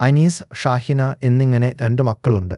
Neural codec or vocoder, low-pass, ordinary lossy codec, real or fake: codec, 24 kHz, 0.5 kbps, DualCodec; 10.8 kHz; none; fake